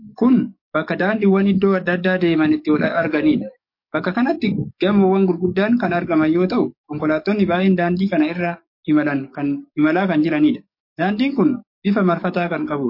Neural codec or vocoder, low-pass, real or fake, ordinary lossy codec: codec, 44.1 kHz, 7.8 kbps, DAC; 5.4 kHz; fake; MP3, 32 kbps